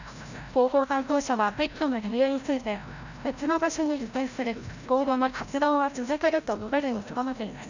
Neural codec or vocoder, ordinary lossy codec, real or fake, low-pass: codec, 16 kHz, 0.5 kbps, FreqCodec, larger model; none; fake; 7.2 kHz